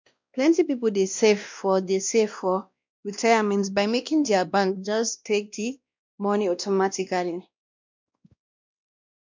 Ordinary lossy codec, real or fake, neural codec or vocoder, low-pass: MP3, 64 kbps; fake; codec, 16 kHz, 1 kbps, X-Codec, WavLM features, trained on Multilingual LibriSpeech; 7.2 kHz